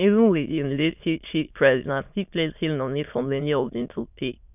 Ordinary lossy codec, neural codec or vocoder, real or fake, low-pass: none; autoencoder, 22.05 kHz, a latent of 192 numbers a frame, VITS, trained on many speakers; fake; 3.6 kHz